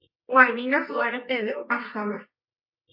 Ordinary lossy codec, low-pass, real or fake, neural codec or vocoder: MP3, 32 kbps; 5.4 kHz; fake; codec, 24 kHz, 0.9 kbps, WavTokenizer, medium music audio release